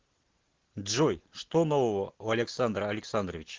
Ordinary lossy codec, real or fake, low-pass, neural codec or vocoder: Opus, 16 kbps; real; 7.2 kHz; none